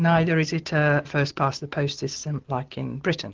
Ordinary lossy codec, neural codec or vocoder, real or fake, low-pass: Opus, 16 kbps; none; real; 7.2 kHz